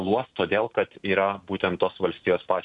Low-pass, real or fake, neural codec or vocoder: 10.8 kHz; real; none